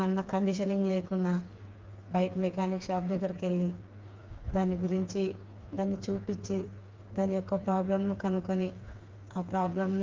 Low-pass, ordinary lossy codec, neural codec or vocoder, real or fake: 7.2 kHz; Opus, 24 kbps; codec, 16 kHz, 4 kbps, FreqCodec, smaller model; fake